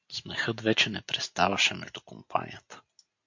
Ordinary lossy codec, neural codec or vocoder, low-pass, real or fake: MP3, 48 kbps; none; 7.2 kHz; real